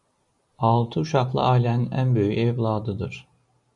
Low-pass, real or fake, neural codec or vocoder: 10.8 kHz; real; none